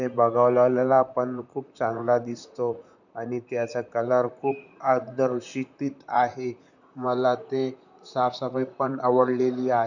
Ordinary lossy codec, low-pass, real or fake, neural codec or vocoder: none; 7.2 kHz; fake; vocoder, 44.1 kHz, 128 mel bands, Pupu-Vocoder